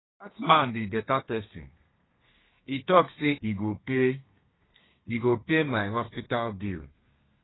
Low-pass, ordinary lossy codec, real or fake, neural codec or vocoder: 7.2 kHz; AAC, 16 kbps; fake; codec, 32 kHz, 1.9 kbps, SNAC